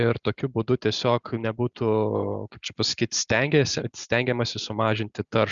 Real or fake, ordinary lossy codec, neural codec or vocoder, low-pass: real; Opus, 64 kbps; none; 7.2 kHz